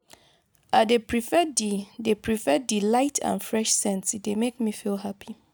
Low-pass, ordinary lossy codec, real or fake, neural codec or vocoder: none; none; real; none